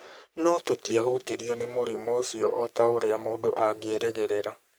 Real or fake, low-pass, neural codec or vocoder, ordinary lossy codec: fake; none; codec, 44.1 kHz, 3.4 kbps, Pupu-Codec; none